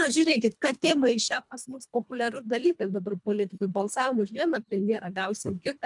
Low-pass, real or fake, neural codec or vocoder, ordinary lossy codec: 10.8 kHz; fake; codec, 24 kHz, 1.5 kbps, HILCodec; MP3, 96 kbps